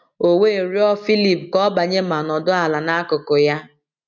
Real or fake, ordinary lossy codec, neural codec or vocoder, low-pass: real; none; none; 7.2 kHz